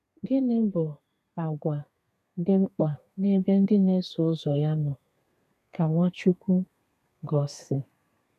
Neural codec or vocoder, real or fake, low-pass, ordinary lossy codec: codec, 32 kHz, 1.9 kbps, SNAC; fake; 14.4 kHz; none